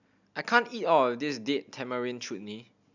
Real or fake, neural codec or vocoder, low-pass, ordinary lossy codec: real; none; 7.2 kHz; none